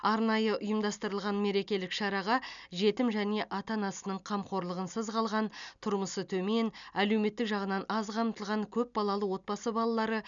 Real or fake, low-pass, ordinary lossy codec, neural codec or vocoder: real; 7.2 kHz; none; none